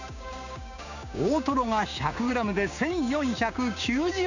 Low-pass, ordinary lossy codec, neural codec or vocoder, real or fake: 7.2 kHz; none; codec, 16 kHz, 6 kbps, DAC; fake